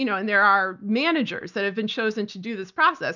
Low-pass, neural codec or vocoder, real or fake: 7.2 kHz; none; real